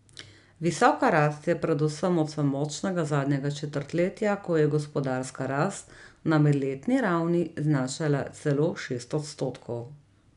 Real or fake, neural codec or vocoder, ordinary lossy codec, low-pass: real; none; none; 10.8 kHz